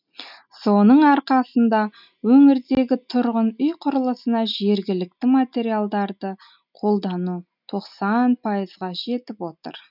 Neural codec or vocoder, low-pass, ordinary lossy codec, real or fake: none; 5.4 kHz; none; real